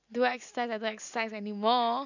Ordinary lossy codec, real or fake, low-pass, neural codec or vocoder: none; real; 7.2 kHz; none